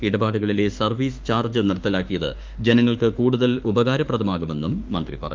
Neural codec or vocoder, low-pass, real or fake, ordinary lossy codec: autoencoder, 48 kHz, 32 numbers a frame, DAC-VAE, trained on Japanese speech; 7.2 kHz; fake; Opus, 24 kbps